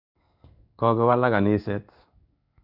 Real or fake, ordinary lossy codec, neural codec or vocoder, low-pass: fake; none; codec, 16 kHz in and 24 kHz out, 1 kbps, XY-Tokenizer; 5.4 kHz